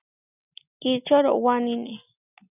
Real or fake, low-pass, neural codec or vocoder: real; 3.6 kHz; none